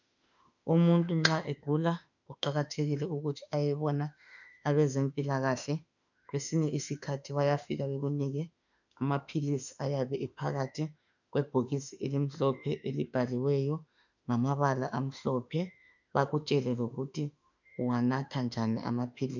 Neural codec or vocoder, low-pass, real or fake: autoencoder, 48 kHz, 32 numbers a frame, DAC-VAE, trained on Japanese speech; 7.2 kHz; fake